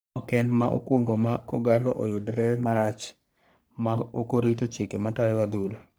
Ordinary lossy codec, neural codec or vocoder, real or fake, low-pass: none; codec, 44.1 kHz, 3.4 kbps, Pupu-Codec; fake; none